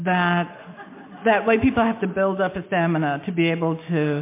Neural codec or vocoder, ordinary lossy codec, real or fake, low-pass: none; MP3, 24 kbps; real; 3.6 kHz